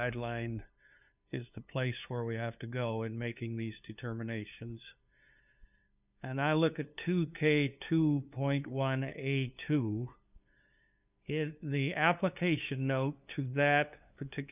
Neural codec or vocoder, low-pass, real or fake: codec, 16 kHz, 2 kbps, FunCodec, trained on LibriTTS, 25 frames a second; 3.6 kHz; fake